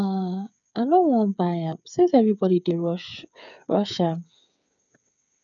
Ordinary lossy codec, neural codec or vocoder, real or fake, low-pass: none; codec, 16 kHz, 8 kbps, FreqCodec, smaller model; fake; 7.2 kHz